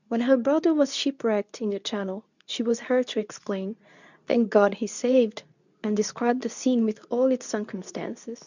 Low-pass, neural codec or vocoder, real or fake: 7.2 kHz; codec, 24 kHz, 0.9 kbps, WavTokenizer, medium speech release version 2; fake